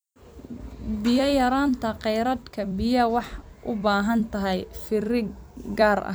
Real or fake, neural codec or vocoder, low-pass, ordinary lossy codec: fake; vocoder, 44.1 kHz, 128 mel bands every 256 samples, BigVGAN v2; none; none